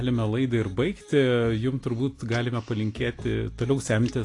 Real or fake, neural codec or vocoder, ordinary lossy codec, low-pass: real; none; AAC, 48 kbps; 10.8 kHz